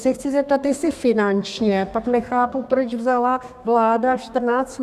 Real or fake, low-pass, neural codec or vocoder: fake; 14.4 kHz; codec, 32 kHz, 1.9 kbps, SNAC